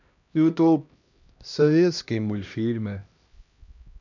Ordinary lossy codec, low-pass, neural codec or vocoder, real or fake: none; 7.2 kHz; codec, 16 kHz, 1 kbps, X-Codec, HuBERT features, trained on LibriSpeech; fake